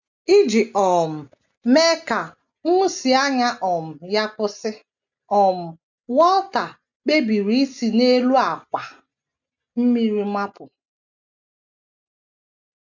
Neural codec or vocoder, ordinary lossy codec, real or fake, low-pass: none; none; real; 7.2 kHz